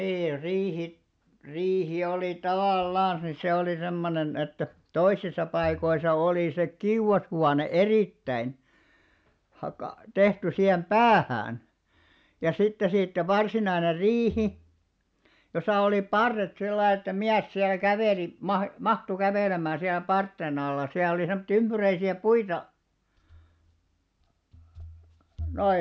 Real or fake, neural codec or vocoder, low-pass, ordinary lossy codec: real; none; none; none